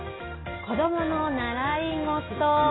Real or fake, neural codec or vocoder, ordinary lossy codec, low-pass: real; none; AAC, 16 kbps; 7.2 kHz